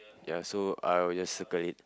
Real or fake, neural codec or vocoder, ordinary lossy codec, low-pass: real; none; none; none